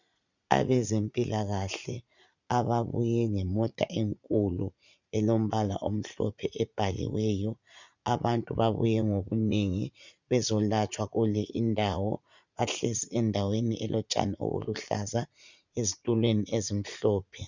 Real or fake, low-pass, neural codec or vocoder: fake; 7.2 kHz; vocoder, 44.1 kHz, 80 mel bands, Vocos